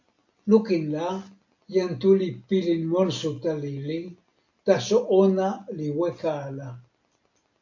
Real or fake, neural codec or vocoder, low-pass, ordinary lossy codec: real; none; 7.2 kHz; AAC, 48 kbps